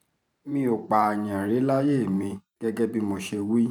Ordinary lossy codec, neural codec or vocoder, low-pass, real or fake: none; vocoder, 44.1 kHz, 128 mel bands every 512 samples, BigVGAN v2; 19.8 kHz; fake